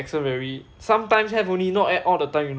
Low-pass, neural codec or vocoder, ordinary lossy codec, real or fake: none; none; none; real